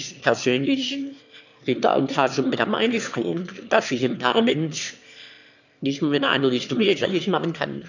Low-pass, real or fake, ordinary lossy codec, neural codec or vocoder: 7.2 kHz; fake; none; autoencoder, 22.05 kHz, a latent of 192 numbers a frame, VITS, trained on one speaker